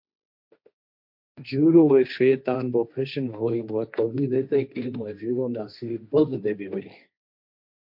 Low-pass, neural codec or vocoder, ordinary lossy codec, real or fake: 5.4 kHz; codec, 16 kHz, 1.1 kbps, Voila-Tokenizer; MP3, 48 kbps; fake